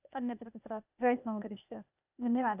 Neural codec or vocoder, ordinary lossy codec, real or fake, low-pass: codec, 16 kHz, 0.8 kbps, ZipCodec; none; fake; 3.6 kHz